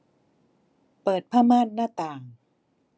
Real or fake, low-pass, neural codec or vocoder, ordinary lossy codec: real; none; none; none